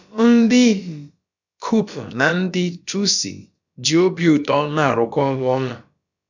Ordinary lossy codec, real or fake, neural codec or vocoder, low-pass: none; fake; codec, 16 kHz, about 1 kbps, DyCAST, with the encoder's durations; 7.2 kHz